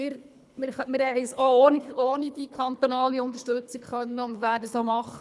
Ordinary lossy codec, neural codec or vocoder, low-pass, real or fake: none; codec, 24 kHz, 3 kbps, HILCodec; none; fake